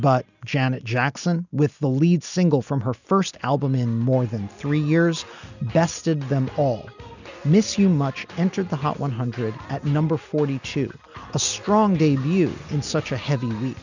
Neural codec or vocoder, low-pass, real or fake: none; 7.2 kHz; real